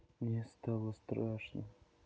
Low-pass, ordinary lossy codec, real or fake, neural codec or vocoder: none; none; real; none